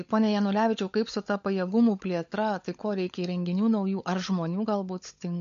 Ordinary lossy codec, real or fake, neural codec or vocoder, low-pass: MP3, 48 kbps; fake; codec, 16 kHz, 16 kbps, FunCodec, trained on LibriTTS, 50 frames a second; 7.2 kHz